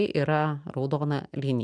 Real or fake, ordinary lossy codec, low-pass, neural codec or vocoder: real; MP3, 96 kbps; 9.9 kHz; none